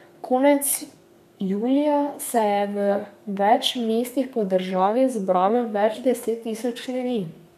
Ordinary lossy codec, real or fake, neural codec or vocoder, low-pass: none; fake; codec, 32 kHz, 1.9 kbps, SNAC; 14.4 kHz